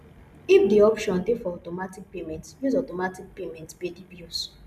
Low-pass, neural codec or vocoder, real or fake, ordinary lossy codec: 14.4 kHz; none; real; none